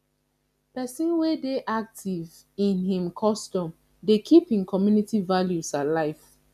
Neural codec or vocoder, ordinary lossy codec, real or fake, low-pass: none; none; real; 14.4 kHz